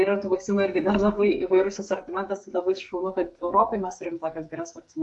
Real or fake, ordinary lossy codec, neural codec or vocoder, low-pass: fake; AAC, 64 kbps; codec, 44.1 kHz, 2.6 kbps, SNAC; 10.8 kHz